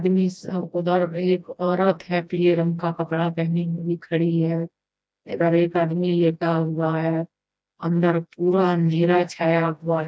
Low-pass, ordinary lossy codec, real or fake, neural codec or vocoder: none; none; fake; codec, 16 kHz, 1 kbps, FreqCodec, smaller model